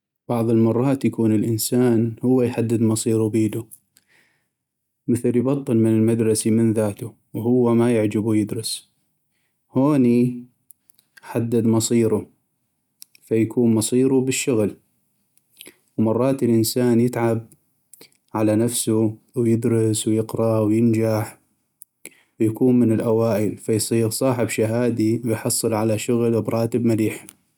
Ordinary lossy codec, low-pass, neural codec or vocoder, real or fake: none; 19.8 kHz; none; real